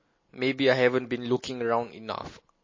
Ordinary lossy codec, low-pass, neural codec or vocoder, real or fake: MP3, 32 kbps; 7.2 kHz; none; real